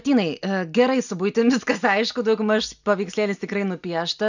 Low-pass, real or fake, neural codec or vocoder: 7.2 kHz; real; none